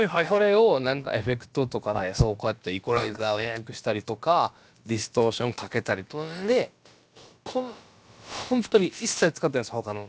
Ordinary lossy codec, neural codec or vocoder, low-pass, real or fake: none; codec, 16 kHz, about 1 kbps, DyCAST, with the encoder's durations; none; fake